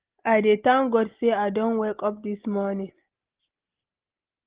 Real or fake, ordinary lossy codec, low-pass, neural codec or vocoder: real; Opus, 16 kbps; 3.6 kHz; none